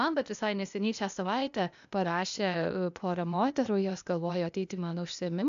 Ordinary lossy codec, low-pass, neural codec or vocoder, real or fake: AAC, 96 kbps; 7.2 kHz; codec, 16 kHz, 0.8 kbps, ZipCodec; fake